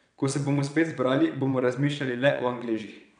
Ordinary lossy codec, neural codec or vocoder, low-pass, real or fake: none; vocoder, 22.05 kHz, 80 mel bands, WaveNeXt; 9.9 kHz; fake